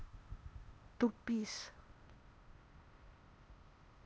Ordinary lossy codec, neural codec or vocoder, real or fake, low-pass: none; codec, 16 kHz, 0.8 kbps, ZipCodec; fake; none